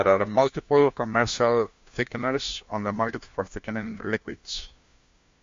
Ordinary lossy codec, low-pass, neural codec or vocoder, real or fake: MP3, 48 kbps; 7.2 kHz; codec, 16 kHz, 1 kbps, FunCodec, trained on LibriTTS, 50 frames a second; fake